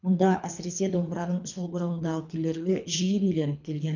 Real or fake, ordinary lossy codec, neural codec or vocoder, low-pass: fake; none; codec, 24 kHz, 3 kbps, HILCodec; 7.2 kHz